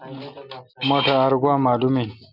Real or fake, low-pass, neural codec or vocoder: real; 5.4 kHz; none